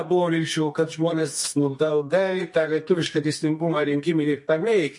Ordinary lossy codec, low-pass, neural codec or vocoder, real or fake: MP3, 48 kbps; 10.8 kHz; codec, 24 kHz, 0.9 kbps, WavTokenizer, medium music audio release; fake